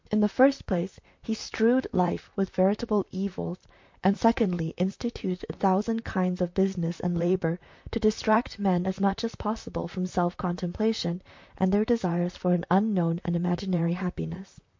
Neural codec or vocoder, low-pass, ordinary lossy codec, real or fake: vocoder, 44.1 kHz, 128 mel bands, Pupu-Vocoder; 7.2 kHz; MP3, 48 kbps; fake